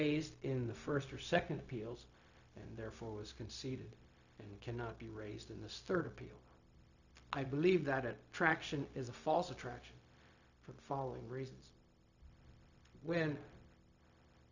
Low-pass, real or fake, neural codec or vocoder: 7.2 kHz; fake; codec, 16 kHz, 0.4 kbps, LongCat-Audio-Codec